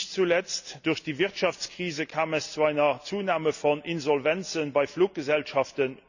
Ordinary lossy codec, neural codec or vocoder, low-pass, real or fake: none; none; 7.2 kHz; real